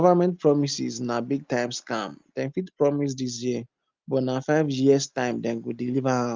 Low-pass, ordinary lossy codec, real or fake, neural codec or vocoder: 7.2 kHz; Opus, 24 kbps; real; none